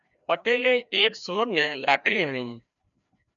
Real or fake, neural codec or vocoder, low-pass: fake; codec, 16 kHz, 1 kbps, FreqCodec, larger model; 7.2 kHz